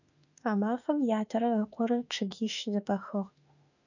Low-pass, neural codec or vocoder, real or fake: 7.2 kHz; autoencoder, 48 kHz, 32 numbers a frame, DAC-VAE, trained on Japanese speech; fake